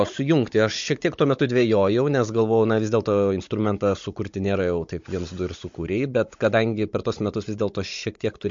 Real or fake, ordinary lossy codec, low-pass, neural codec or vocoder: fake; MP3, 48 kbps; 7.2 kHz; codec, 16 kHz, 16 kbps, FunCodec, trained on Chinese and English, 50 frames a second